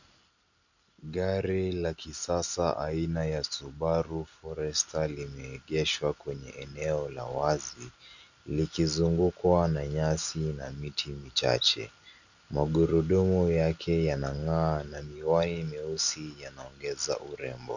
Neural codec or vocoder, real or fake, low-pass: none; real; 7.2 kHz